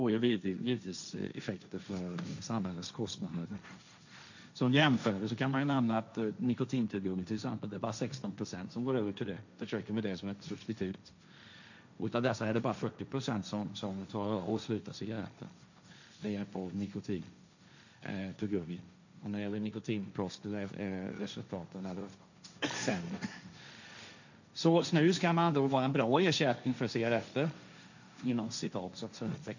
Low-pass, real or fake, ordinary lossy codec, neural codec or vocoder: 7.2 kHz; fake; none; codec, 16 kHz, 1.1 kbps, Voila-Tokenizer